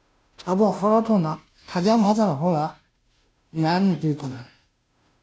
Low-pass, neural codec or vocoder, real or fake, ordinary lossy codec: none; codec, 16 kHz, 0.5 kbps, FunCodec, trained on Chinese and English, 25 frames a second; fake; none